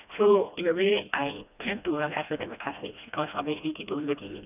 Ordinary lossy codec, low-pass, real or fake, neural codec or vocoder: none; 3.6 kHz; fake; codec, 16 kHz, 1 kbps, FreqCodec, smaller model